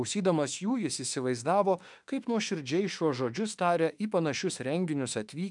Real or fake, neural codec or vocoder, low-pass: fake; autoencoder, 48 kHz, 32 numbers a frame, DAC-VAE, trained on Japanese speech; 10.8 kHz